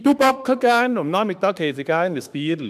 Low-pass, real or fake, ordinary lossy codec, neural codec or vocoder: 14.4 kHz; fake; none; autoencoder, 48 kHz, 32 numbers a frame, DAC-VAE, trained on Japanese speech